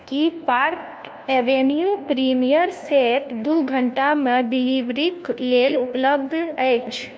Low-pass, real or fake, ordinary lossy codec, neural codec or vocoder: none; fake; none; codec, 16 kHz, 1 kbps, FunCodec, trained on LibriTTS, 50 frames a second